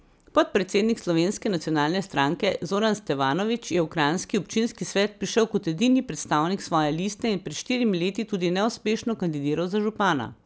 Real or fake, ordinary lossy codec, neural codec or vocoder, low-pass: real; none; none; none